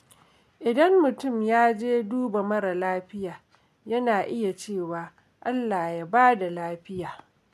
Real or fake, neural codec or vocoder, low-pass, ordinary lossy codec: real; none; 14.4 kHz; MP3, 96 kbps